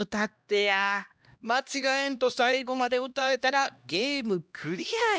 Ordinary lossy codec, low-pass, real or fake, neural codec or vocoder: none; none; fake; codec, 16 kHz, 1 kbps, X-Codec, HuBERT features, trained on LibriSpeech